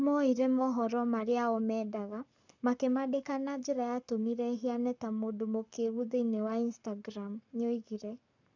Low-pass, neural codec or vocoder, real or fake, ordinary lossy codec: 7.2 kHz; codec, 44.1 kHz, 7.8 kbps, Pupu-Codec; fake; none